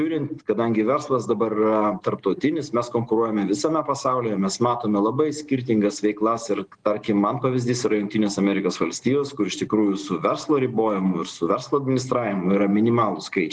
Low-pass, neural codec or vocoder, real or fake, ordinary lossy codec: 9.9 kHz; none; real; Opus, 24 kbps